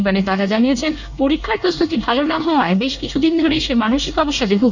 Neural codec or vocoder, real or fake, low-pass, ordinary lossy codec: codec, 24 kHz, 1 kbps, SNAC; fake; 7.2 kHz; none